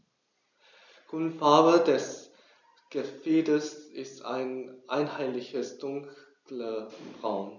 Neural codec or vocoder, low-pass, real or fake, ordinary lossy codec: none; 7.2 kHz; real; none